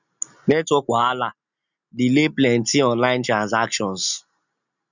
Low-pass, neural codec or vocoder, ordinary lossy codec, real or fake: 7.2 kHz; none; none; real